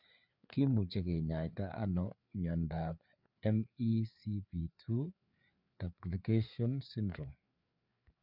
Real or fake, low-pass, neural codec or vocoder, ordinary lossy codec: fake; 5.4 kHz; codec, 16 kHz, 4 kbps, FreqCodec, larger model; none